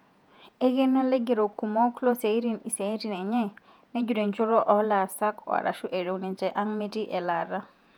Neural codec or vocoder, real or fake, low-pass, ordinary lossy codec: vocoder, 48 kHz, 128 mel bands, Vocos; fake; 19.8 kHz; none